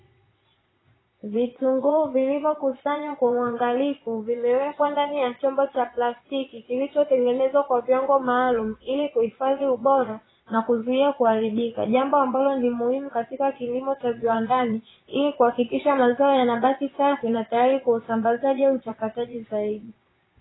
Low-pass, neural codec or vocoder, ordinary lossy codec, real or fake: 7.2 kHz; vocoder, 22.05 kHz, 80 mel bands, Vocos; AAC, 16 kbps; fake